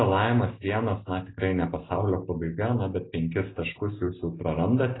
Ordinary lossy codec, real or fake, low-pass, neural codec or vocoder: AAC, 16 kbps; real; 7.2 kHz; none